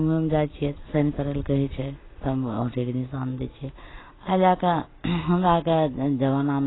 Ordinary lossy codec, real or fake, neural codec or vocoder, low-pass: AAC, 16 kbps; real; none; 7.2 kHz